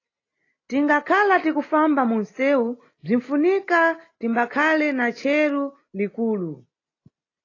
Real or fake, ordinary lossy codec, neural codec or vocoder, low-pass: real; AAC, 32 kbps; none; 7.2 kHz